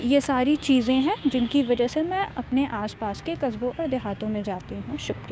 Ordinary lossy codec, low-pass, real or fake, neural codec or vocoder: none; none; fake; codec, 16 kHz, 6 kbps, DAC